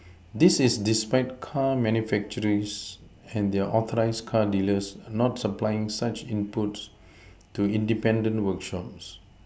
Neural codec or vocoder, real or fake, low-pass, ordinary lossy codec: none; real; none; none